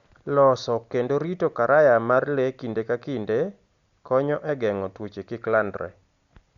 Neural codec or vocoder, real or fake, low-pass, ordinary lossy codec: none; real; 7.2 kHz; Opus, 64 kbps